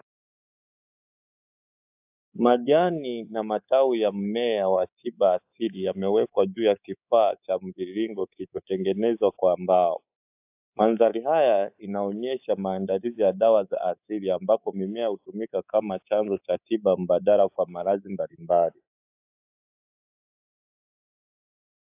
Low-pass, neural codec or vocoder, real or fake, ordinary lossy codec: 3.6 kHz; codec, 24 kHz, 3.1 kbps, DualCodec; fake; AAC, 32 kbps